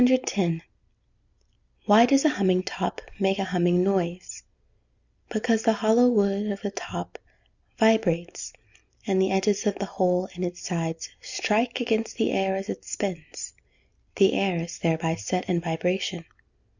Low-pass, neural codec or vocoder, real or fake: 7.2 kHz; none; real